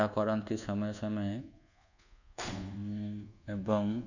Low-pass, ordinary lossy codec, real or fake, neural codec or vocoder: 7.2 kHz; none; fake; codec, 24 kHz, 1.2 kbps, DualCodec